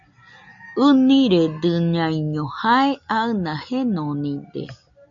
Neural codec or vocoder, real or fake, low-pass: none; real; 7.2 kHz